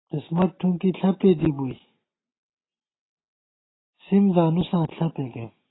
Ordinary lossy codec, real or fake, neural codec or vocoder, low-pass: AAC, 16 kbps; real; none; 7.2 kHz